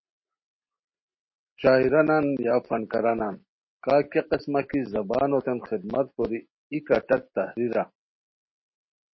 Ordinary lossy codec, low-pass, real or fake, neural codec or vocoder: MP3, 24 kbps; 7.2 kHz; real; none